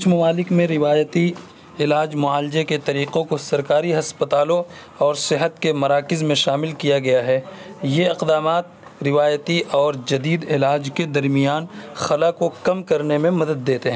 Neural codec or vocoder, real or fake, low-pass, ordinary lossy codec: none; real; none; none